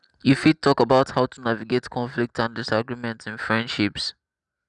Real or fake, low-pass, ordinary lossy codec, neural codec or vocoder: real; 10.8 kHz; none; none